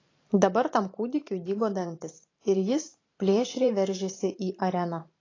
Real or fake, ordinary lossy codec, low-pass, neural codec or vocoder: fake; AAC, 32 kbps; 7.2 kHz; vocoder, 44.1 kHz, 128 mel bands every 512 samples, BigVGAN v2